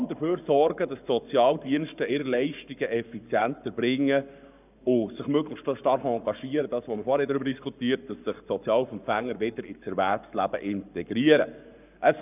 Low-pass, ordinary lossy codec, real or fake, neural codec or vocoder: 3.6 kHz; none; fake; codec, 44.1 kHz, 7.8 kbps, Pupu-Codec